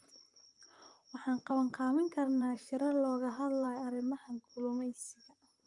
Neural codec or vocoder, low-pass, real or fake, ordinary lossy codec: vocoder, 44.1 kHz, 128 mel bands, Pupu-Vocoder; 10.8 kHz; fake; Opus, 32 kbps